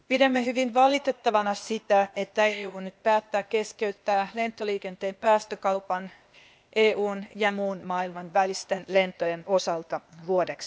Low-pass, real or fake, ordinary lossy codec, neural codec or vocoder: none; fake; none; codec, 16 kHz, 0.8 kbps, ZipCodec